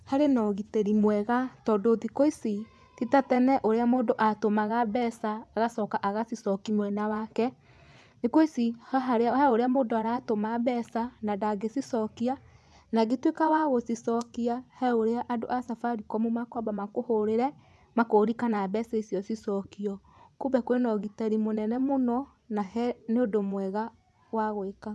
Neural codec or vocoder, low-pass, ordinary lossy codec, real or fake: vocoder, 24 kHz, 100 mel bands, Vocos; none; none; fake